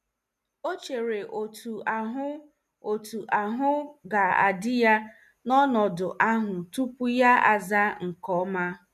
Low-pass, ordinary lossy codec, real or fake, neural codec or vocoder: 14.4 kHz; none; real; none